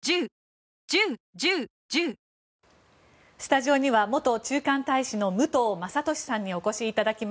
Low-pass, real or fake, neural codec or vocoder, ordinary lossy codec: none; real; none; none